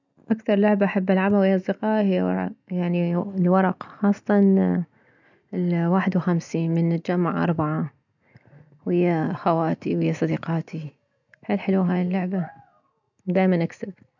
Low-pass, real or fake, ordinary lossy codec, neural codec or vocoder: 7.2 kHz; real; none; none